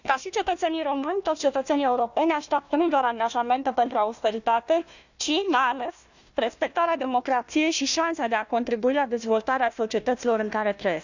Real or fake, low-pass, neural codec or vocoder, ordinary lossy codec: fake; 7.2 kHz; codec, 16 kHz, 1 kbps, FunCodec, trained on Chinese and English, 50 frames a second; none